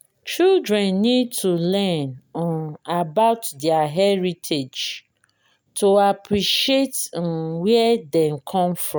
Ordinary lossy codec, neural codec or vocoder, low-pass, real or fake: none; none; none; real